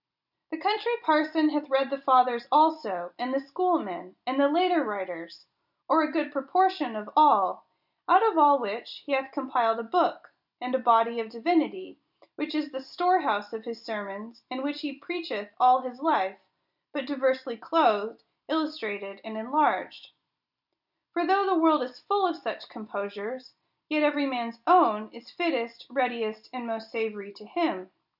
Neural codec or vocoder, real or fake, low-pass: none; real; 5.4 kHz